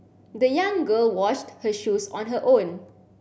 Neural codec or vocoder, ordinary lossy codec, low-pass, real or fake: none; none; none; real